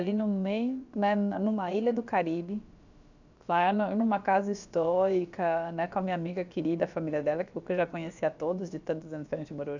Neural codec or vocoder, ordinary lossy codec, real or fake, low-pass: codec, 16 kHz, about 1 kbps, DyCAST, with the encoder's durations; none; fake; 7.2 kHz